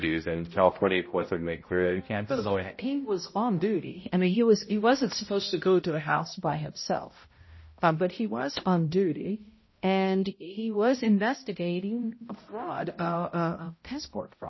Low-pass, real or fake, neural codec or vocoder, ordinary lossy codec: 7.2 kHz; fake; codec, 16 kHz, 0.5 kbps, X-Codec, HuBERT features, trained on balanced general audio; MP3, 24 kbps